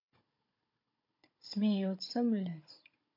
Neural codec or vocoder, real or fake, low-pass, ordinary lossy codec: codec, 16 kHz, 16 kbps, FunCodec, trained on Chinese and English, 50 frames a second; fake; 5.4 kHz; MP3, 32 kbps